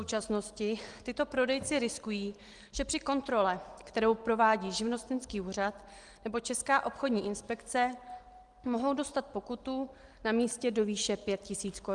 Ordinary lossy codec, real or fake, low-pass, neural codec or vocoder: Opus, 24 kbps; real; 10.8 kHz; none